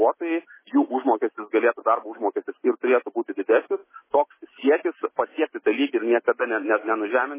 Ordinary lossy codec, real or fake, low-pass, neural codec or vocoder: MP3, 16 kbps; real; 3.6 kHz; none